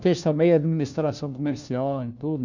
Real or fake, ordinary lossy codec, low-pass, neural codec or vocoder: fake; none; 7.2 kHz; codec, 16 kHz, 1 kbps, FunCodec, trained on LibriTTS, 50 frames a second